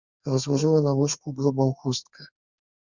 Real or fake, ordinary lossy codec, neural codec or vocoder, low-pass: fake; Opus, 64 kbps; codec, 32 kHz, 1.9 kbps, SNAC; 7.2 kHz